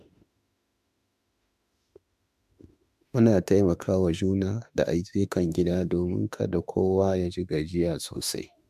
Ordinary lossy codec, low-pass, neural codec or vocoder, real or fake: AAC, 96 kbps; 14.4 kHz; autoencoder, 48 kHz, 32 numbers a frame, DAC-VAE, trained on Japanese speech; fake